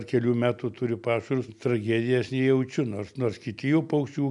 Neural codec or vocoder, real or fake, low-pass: none; real; 10.8 kHz